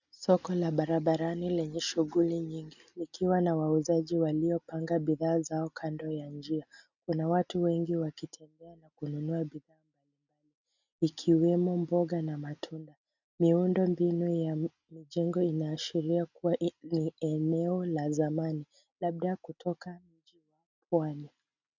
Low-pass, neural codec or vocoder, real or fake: 7.2 kHz; none; real